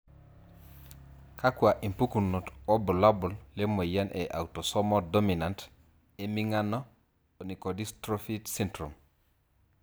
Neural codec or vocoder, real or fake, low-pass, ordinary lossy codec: none; real; none; none